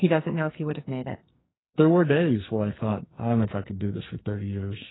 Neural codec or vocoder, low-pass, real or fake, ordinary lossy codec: codec, 44.1 kHz, 2.6 kbps, SNAC; 7.2 kHz; fake; AAC, 16 kbps